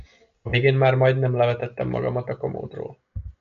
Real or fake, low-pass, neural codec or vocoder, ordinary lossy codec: real; 7.2 kHz; none; MP3, 48 kbps